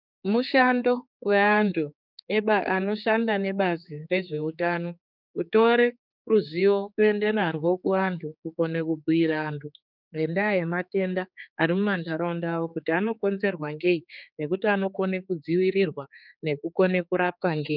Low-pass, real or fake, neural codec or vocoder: 5.4 kHz; fake; codec, 16 kHz, 4 kbps, X-Codec, HuBERT features, trained on general audio